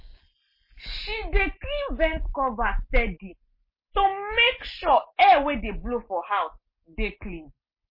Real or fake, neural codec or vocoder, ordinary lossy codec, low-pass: real; none; MP3, 24 kbps; 5.4 kHz